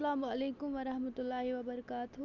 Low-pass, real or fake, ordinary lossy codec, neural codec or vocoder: 7.2 kHz; real; none; none